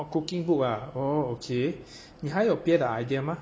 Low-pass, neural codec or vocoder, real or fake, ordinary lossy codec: none; none; real; none